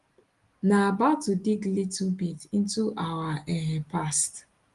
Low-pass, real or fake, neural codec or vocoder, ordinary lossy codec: 10.8 kHz; real; none; Opus, 24 kbps